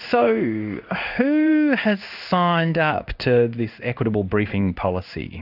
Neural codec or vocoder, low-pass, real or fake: codec, 16 kHz in and 24 kHz out, 1 kbps, XY-Tokenizer; 5.4 kHz; fake